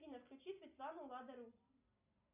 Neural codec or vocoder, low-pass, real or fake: none; 3.6 kHz; real